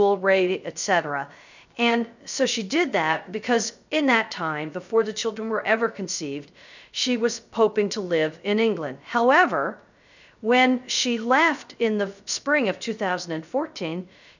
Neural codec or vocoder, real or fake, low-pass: codec, 16 kHz, 0.3 kbps, FocalCodec; fake; 7.2 kHz